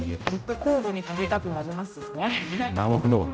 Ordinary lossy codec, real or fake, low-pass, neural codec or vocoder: none; fake; none; codec, 16 kHz, 0.5 kbps, X-Codec, HuBERT features, trained on general audio